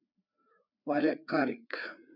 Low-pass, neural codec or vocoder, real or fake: 5.4 kHz; codec, 16 kHz, 4 kbps, FreqCodec, larger model; fake